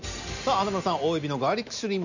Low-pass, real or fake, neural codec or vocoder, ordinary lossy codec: 7.2 kHz; real; none; none